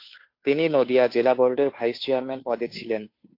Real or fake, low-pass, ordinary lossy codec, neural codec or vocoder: fake; 5.4 kHz; AAC, 32 kbps; codec, 16 kHz, 2 kbps, FunCodec, trained on Chinese and English, 25 frames a second